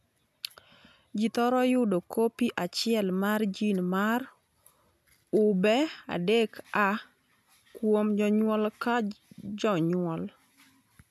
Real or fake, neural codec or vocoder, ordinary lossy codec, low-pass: real; none; none; 14.4 kHz